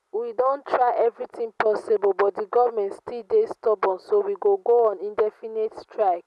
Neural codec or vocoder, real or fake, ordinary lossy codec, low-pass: none; real; none; none